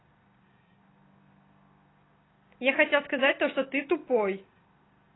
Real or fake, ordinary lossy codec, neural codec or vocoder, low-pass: real; AAC, 16 kbps; none; 7.2 kHz